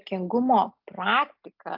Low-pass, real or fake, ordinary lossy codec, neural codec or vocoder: 5.4 kHz; real; AAC, 48 kbps; none